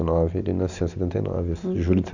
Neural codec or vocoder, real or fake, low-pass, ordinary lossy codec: none; real; 7.2 kHz; none